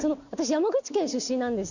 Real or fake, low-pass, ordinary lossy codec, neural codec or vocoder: real; 7.2 kHz; none; none